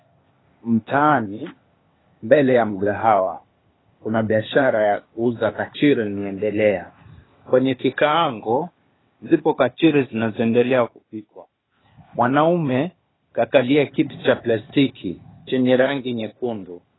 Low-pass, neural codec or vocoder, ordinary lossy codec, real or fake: 7.2 kHz; codec, 16 kHz, 0.8 kbps, ZipCodec; AAC, 16 kbps; fake